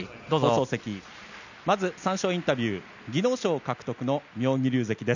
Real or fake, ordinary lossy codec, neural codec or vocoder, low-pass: real; none; none; 7.2 kHz